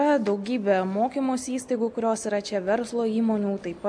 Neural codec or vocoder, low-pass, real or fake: none; 9.9 kHz; real